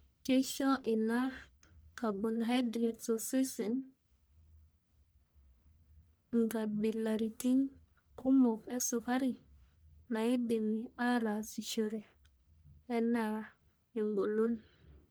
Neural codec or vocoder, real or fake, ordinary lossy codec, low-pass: codec, 44.1 kHz, 1.7 kbps, Pupu-Codec; fake; none; none